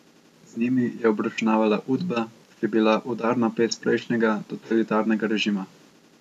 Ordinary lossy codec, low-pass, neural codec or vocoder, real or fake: none; 14.4 kHz; none; real